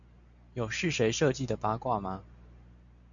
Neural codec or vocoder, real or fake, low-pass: none; real; 7.2 kHz